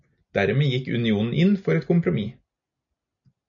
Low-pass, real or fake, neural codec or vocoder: 7.2 kHz; real; none